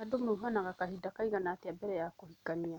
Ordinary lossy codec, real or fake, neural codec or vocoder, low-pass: none; real; none; 19.8 kHz